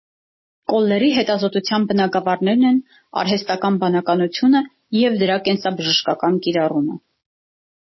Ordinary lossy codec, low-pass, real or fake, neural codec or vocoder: MP3, 24 kbps; 7.2 kHz; real; none